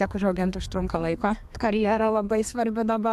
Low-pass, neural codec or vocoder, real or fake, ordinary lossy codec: 14.4 kHz; codec, 32 kHz, 1.9 kbps, SNAC; fake; AAC, 96 kbps